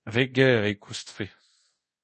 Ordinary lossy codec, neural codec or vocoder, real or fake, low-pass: MP3, 32 kbps; codec, 24 kHz, 0.5 kbps, DualCodec; fake; 10.8 kHz